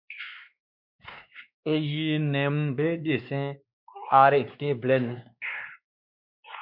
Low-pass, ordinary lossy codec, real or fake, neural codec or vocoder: 5.4 kHz; MP3, 48 kbps; fake; codec, 16 kHz, 2 kbps, X-Codec, WavLM features, trained on Multilingual LibriSpeech